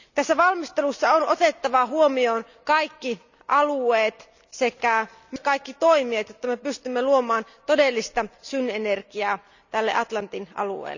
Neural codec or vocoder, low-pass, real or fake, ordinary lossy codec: none; 7.2 kHz; real; none